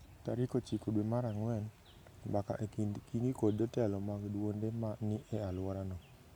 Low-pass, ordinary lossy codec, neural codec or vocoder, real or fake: none; none; none; real